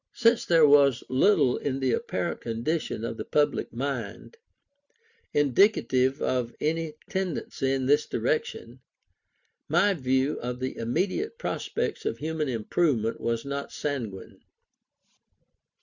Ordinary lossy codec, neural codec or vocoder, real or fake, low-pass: Opus, 64 kbps; none; real; 7.2 kHz